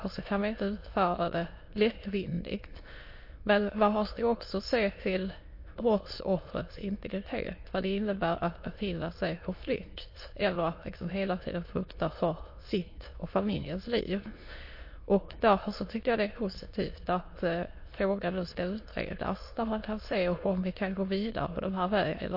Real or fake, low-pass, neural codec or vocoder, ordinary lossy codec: fake; 5.4 kHz; autoencoder, 22.05 kHz, a latent of 192 numbers a frame, VITS, trained on many speakers; MP3, 32 kbps